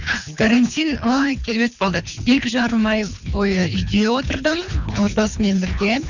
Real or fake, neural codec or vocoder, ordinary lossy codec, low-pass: fake; codec, 24 kHz, 3 kbps, HILCodec; none; 7.2 kHz